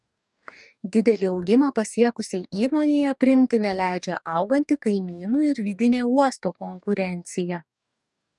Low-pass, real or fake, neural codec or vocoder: 10.8 kHz; fake; codec, 44.1 kHz, 2.6 kbps, DAC